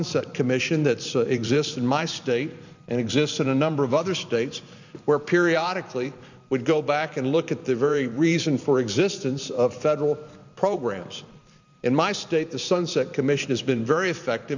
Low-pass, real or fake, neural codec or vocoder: 7.2 kHz; fake; vocoder, 44.1 kHz, 128 mel bands every 512 samples, BigVGAN v2